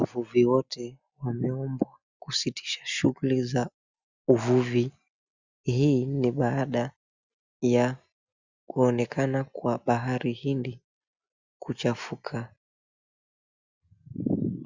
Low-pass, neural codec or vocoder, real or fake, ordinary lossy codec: 7.2 kHz; none; real; Opus, 64 kbps